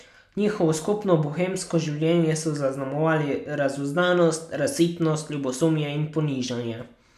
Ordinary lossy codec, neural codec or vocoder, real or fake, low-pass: none; none; real; 14.4 kHz